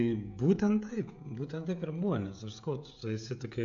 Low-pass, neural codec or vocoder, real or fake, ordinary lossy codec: 7.2 kHz; codec, 16 kHz, 16 kbps, FreqCodec, smaller model; fake; AAC, 64 kbps